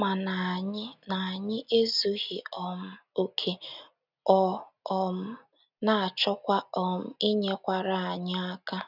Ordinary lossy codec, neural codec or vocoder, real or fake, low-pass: none; none; real; 5.4 kHz